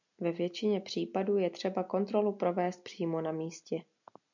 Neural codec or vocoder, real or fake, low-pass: none; real; 7.2 kHz